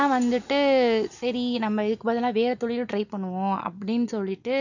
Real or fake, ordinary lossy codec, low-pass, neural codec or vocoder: real; none; 7.2 kHz; none